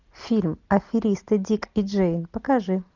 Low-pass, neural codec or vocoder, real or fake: 7.2 kHz; none; real